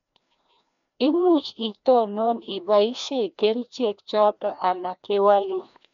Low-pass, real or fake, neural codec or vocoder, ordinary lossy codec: 7.2 kHz; fake; codec, 16 kHz, 1 kbps, FreqCodec, larger model; none